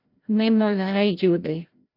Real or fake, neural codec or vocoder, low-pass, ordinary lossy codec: fake; codec, 16 kHz, 0.5 kbps, FreqCodec, larger model; 5.4 kHz; AAC, 32 kbps